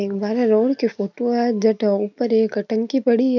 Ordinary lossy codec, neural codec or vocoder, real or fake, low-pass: none; none; real; 7.2 kHz